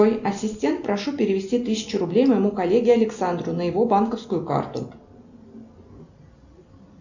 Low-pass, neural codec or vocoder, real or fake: 7.2 kHz; none; real